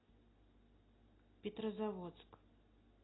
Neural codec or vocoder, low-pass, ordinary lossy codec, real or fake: none; 7.2 kHz; AAC, 16 kbps; real